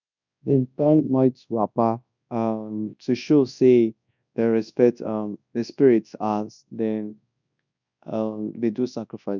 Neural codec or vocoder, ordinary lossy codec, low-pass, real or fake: codec, 24 kHz, 0.9 kbps, WavTokenizer, large speech release; none; 7.2 kHz; fake